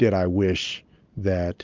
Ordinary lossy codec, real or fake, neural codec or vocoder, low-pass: Opus, 24 kbps; real; none; 7.2 kHz